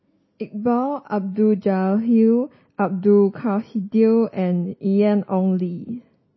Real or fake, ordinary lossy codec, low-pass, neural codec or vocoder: real; MP3, 24 kbps; 7.2 kHz; none